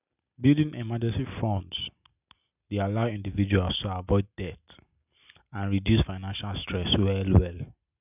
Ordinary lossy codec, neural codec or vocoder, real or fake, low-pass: none; none; real; 3.6 kHz